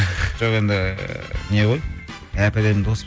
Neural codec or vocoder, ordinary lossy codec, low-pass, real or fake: none; none; none; real